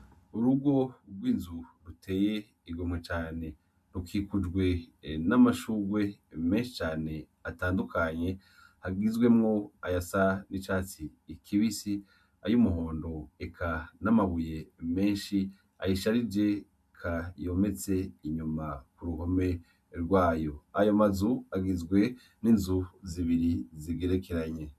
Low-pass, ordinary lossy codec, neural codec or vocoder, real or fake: 14.4 kHz; MP3, 96 kbps; none; real